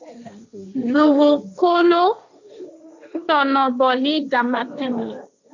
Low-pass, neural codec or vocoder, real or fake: 7.2 kHz; codec, 16 kHz, 1.1 kbps, Voila-Tokenizer; fake